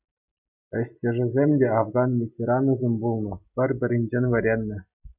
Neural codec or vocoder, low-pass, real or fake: none; 3.6 kHz; real